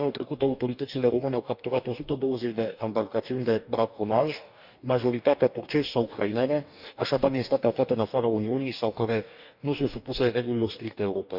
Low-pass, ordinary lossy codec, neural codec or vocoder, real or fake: 5.4 kHz; none; codec, 44.1 kHz, 2.6 kbps, DAC; fake